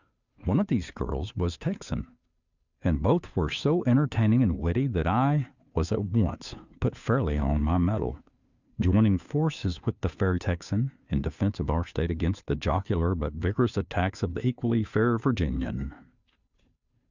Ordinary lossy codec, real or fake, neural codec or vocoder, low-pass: Opus, 64 kbps; fake; codec, 16 kHz, 2 kbps, FunCodec, trained on Chinese and English, 25 frames a second; 7.2 kHz